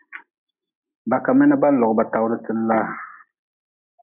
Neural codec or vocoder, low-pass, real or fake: none; 3.6 kHz; real